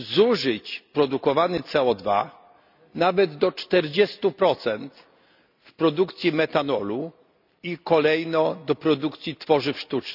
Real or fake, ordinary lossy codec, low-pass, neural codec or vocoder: real; none; 5.4 kHz; none